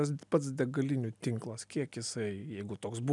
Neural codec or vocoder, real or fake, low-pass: none; real; 10.8 kHz